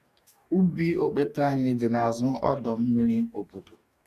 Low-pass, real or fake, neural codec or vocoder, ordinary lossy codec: 14.4 kHz; fake; codec, 44.1 kHz, 2.6 kbps, DAC; none